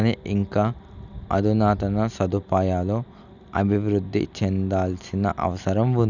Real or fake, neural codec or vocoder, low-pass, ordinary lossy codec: real; none; 7.2 kHz; none